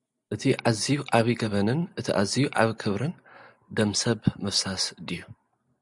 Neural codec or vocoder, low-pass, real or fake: none; 10.8 kHz; real